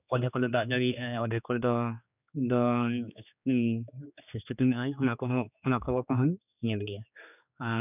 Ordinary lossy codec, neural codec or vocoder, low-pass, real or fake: none; codec, 16 kHz, 2 kbps, X-Codec, HuBERT features, trained on general audio; 3.6 kHz; fake